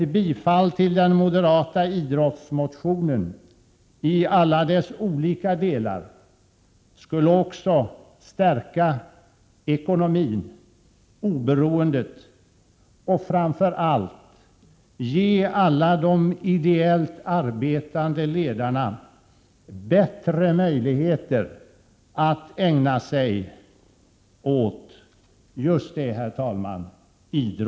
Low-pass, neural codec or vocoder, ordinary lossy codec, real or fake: none; none; none; real